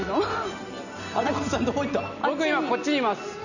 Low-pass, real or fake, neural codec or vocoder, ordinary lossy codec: 7.2 kHz; real; none; none